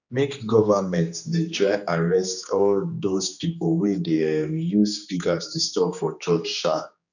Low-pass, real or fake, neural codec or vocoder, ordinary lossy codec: 7.2 kHz; fake; codec, 16 kHz, 2 kbps, X-Codec, HuBERT features, trained on general audio; none